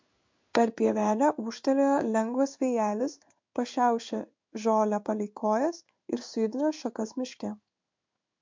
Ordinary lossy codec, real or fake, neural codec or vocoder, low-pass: MP3, 48 kbps; fake; codec, 16 kHz in and 24 kHz out, 1 kbps, XY-Tokenizer; 7.2 kHz